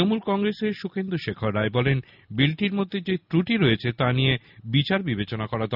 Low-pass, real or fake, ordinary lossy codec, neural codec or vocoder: 5.4 kHz; real; none; none